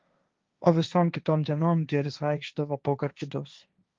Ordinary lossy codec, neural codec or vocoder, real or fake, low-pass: Opus, 32 kbps; codec, 16 kHz, 1.1 kbps, Voila-Tokenizer; fake; 7.2 kHz